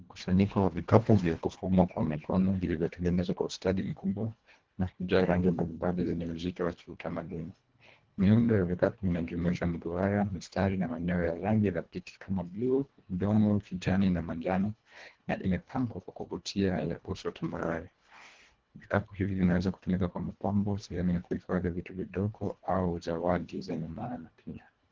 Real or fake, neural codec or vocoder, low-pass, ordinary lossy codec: fake; codec, 24 kHz, 1.5 kbps, HILCodec; 7.2 kHz; Opus, 16 kbps